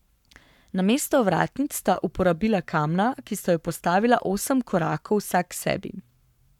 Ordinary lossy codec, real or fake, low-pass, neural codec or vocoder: none; fake; 19.8 kHz; codec, 44.1 kHz, 7.8 kbps, Pupu-Codec